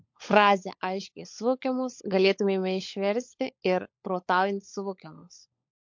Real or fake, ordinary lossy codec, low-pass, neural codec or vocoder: fake; MP3, 48 kbps; 7.2 kHz; codec, 16 kHz, 16 kbps, FunCodec, trained on LibriTTS, 50 frames a second